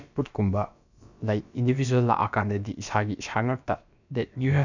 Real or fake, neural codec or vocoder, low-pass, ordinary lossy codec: fake; codec, 16 kHz, about 1 kbps, DyCAST, with the encoder's durations; 7.2 kHz; none